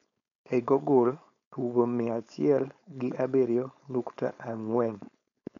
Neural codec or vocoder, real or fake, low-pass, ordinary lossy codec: codec, 16 kHz, 4.8 kbps, FACodec; fake; 7.2 kHz; none